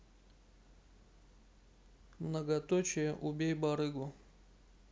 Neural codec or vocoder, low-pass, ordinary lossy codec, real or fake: none; none; none; real